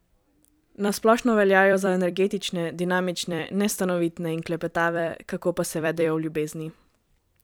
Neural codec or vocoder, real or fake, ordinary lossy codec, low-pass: vocoder, 44.1 kHz, 128 mel bands every 256 samples, BigVGAN v2; fake; none; none